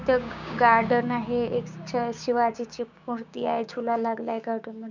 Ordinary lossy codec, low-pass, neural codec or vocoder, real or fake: none; 7.2 kHz; codec, 16 kHz, 6 kbps, DAC; fake